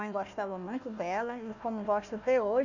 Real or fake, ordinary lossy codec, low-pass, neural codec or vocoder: fake; none; 7.2 kHz; codec, 16 kHz, 1 kbps, FunCodec, trained on Chinese and English, 50 frames a second